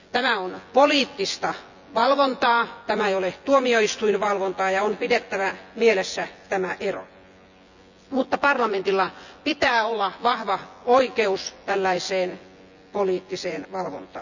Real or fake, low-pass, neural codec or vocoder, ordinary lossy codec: fake; 7.2 kHz; vocoder, 24 kHz, 100 mel bands, Vocos; none